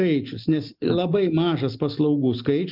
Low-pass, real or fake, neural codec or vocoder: 5.4 kHz; real; none